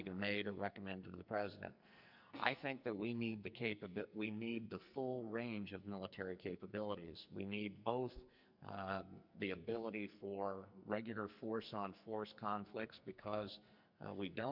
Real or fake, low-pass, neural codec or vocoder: fake; 5.4 kHz; codec, 44.1 kHz, 2.6 kbps, SNAC